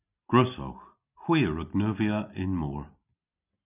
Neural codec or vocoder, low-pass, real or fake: none; 3.6 kHz; real